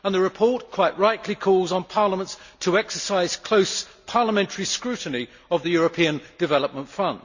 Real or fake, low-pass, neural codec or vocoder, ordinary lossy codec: real; 7.2 kHz; none; Opus, 64 kbps